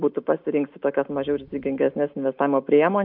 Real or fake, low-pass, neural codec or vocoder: real; 5.4 kHz; none